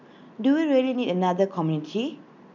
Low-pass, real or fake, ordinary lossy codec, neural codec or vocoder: 7.2 kHz; real; none; none